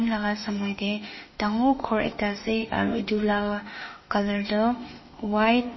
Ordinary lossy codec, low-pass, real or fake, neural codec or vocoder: MP3, 24 kbps; 7.2 kHz; fake; autoencoder, 48 kHz, 32 numbers a frame, DAC-VAE, trained on Japanese speech